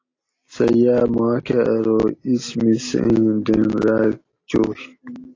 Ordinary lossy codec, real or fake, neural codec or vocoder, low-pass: AAC, 32 kbps; real; none; 7.2 kHz